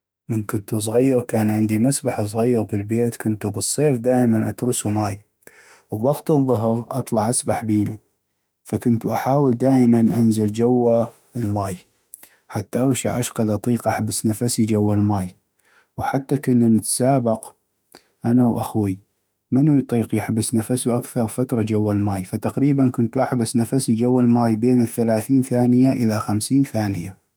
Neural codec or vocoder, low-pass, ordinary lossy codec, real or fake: autoencoder, 48 kHz, 32 numbers a frame, DAC-VAE, trained on Japanese speech; none; none; fake